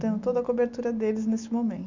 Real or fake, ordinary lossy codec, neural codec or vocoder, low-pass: real; none; none; 7.2 kHz